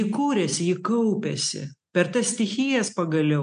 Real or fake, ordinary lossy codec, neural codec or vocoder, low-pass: real; MP3, 64 kbps; none; 9.9 kHz